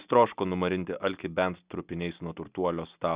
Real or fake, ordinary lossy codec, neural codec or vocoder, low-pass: real; Opus, 64 kbps; none; 3.6 kHz